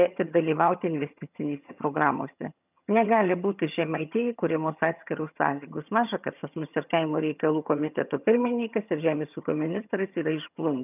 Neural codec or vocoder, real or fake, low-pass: vocoder, 22.05 kHz, 80 mel bands, HiFi-GAN; fake; 3.6 kHz